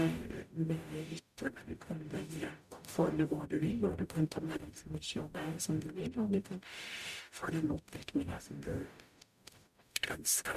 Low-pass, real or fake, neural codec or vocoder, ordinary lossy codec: 14.4 kHz; fake; codec, 44.1 kHz, 0.9 kbps, DAC; MP3, 96 kbps